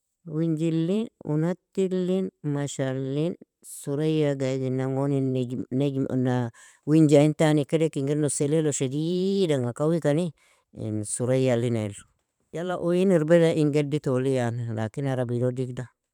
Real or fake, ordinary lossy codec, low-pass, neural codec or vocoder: fake; none; 19.8 kHz; vocoder, 44.1 kHz, 128 mel bands every 512 samples, BigVGAN v2